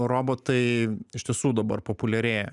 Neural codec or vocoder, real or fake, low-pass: none; real; 10.8 kHz